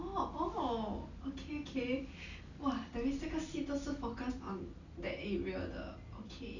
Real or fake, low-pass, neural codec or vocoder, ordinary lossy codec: real; 7.2 kHz; none; none